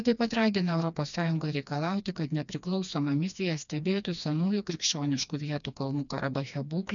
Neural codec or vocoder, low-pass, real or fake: codec, 16 kHz, 2 kbps, FreqCodec, smaller model; 7.2 kHz; fake